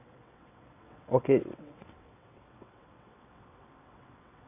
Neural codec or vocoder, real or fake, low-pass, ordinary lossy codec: vocoder, 22.05 kHz, 80 mel bands, WaveNeXt; fake; 3.6 kHz; none